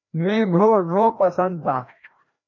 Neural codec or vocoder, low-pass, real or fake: codec, 16 kHz, 1 kbps, FreqCodec, larger model; 7.2 kHz; fake